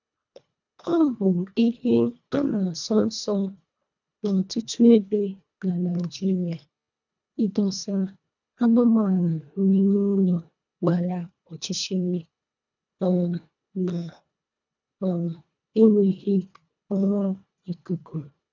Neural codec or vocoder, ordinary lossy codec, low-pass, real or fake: codec, 24 kHz, 1.5 kbps, HILCodec; none; 7.2 kHz; fake